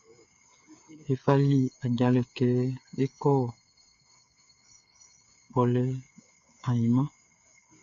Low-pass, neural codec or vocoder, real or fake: 7.2 kHz; codec, 16 kHz, 8 kbps, FreqCodec, smaller model; fake